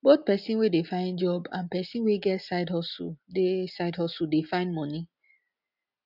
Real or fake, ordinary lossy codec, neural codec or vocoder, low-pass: real; none; none; 5.4 kHz